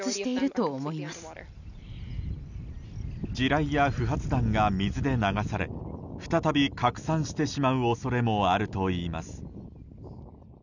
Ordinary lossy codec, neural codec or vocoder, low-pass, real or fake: none; none; 7.2 kHz; real